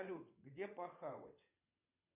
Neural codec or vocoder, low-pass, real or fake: vocoder, 22.05 kHz, 80 mel bands, WaveNeXt; 3.6 kHz; fake